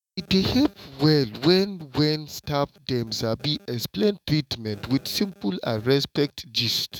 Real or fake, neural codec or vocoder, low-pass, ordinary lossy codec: fake; autoencoder, 48 kHz, 128 numbers a frame, DAC-VAE, trained on Japanese speech; 19.8 kHz; none